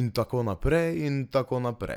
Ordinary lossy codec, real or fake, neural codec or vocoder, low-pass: none; real; none; 19.8 kHz